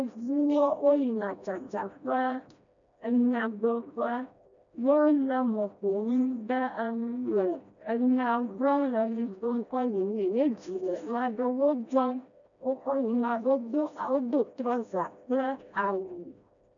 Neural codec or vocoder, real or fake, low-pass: codec, 16 kHz, 1 kbps, FreqCodec, smaller model; fake; 7.2 kHz